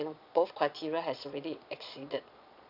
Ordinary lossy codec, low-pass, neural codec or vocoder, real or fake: none; 5.4 kHz; vocoder, 22.05 kHz, 80 mel bands, Vocos; fake